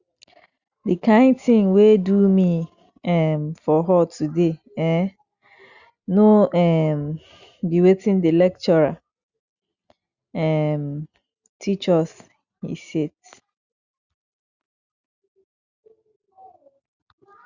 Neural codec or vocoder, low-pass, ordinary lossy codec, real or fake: none; 7.2 kHz; Opus, 64 kbps; real